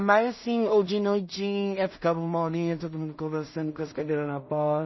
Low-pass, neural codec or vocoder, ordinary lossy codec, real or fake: 7.2 kHz; codec, 16 kHz in and 24 kHz out, 0.4 kbps, LongCat-Audio-Codec, two codebook decoder; MP3, 24 kbps; fake